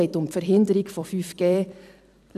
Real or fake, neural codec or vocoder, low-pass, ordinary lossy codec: fake; vocoder, 44.1 kHz, 128 mel bands every 256 samples, BigVGAN v2; 14.4 kHz; none